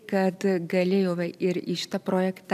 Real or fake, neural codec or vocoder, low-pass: fake; vocoder, 44.1 kHz, 128 mel bands every 256 samples, BigVGAN v2; 14.4 kHz